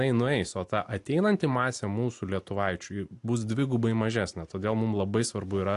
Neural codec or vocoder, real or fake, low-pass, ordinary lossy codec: none; real; 10.8 kHz; AAC, 64 kbps